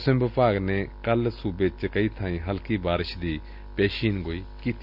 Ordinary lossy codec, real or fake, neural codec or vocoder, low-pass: none; real; none; 5.4 kHz